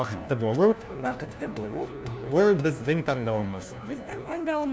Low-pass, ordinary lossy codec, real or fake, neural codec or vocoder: none; none; fake; codec, 16 kHz, 1 kbps, FunCodec, trained on LibriTTS, 50 frames a second